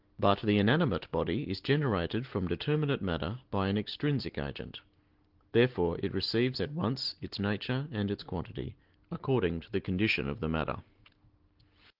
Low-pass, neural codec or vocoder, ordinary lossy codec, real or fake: 5.4 kHz; none; Opus, 16 kbps; real